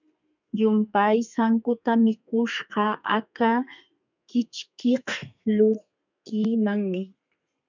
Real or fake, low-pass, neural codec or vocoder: fake; 7.2 kHz; codec, 32 kHz, 1.9 kbps, SNAC